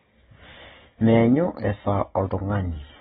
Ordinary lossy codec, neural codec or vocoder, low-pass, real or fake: AAC, 16 kbps; none; 19.8 kHz; real